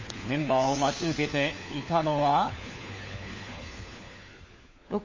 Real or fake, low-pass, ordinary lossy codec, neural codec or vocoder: fake; 7.2 kHz; MP3, 32 kbps; codec, 16 kHz, 4 kbps, FunCodec, trained on LibriTTS, 50 frames a second